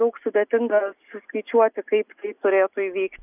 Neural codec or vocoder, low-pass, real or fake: none; 3.6 kHz; real